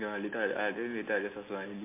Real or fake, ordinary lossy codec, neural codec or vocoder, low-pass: real; MP3, 24 kbps; none; 3.6 kHz